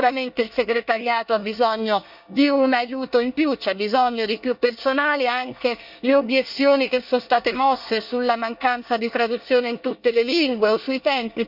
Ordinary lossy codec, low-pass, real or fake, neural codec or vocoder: Opus, 64 kbps; 5.4 kHz; fake; codec, 24 kHz, 1 kbps, SNAC